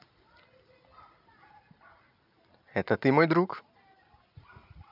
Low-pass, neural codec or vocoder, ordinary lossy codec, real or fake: 5.4 kHz; none; none; real